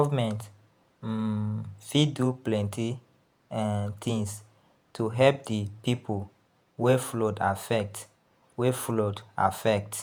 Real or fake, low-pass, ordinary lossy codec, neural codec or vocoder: fake; none; none; vocoder, 48 kHz, 128 mel bands, Vocos